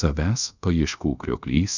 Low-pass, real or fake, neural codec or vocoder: 7.2 kHz; fake; codec, 24 kHz, 0.5 kbps, DualCodec